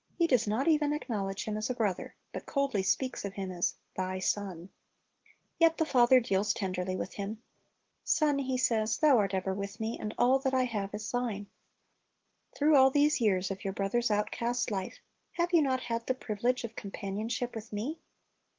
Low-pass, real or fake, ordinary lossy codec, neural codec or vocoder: 7.2 kHz; real; Opus, 16 kbps; none